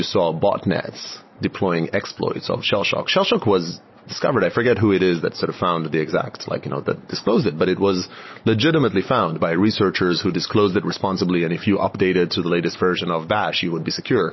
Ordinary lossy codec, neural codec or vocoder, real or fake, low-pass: MP3, 24 kbps; none; real; 7.2 kHz